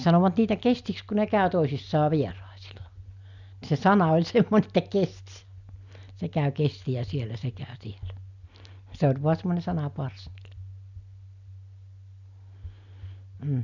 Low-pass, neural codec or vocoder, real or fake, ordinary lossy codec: 7.2 kHz; none; real; none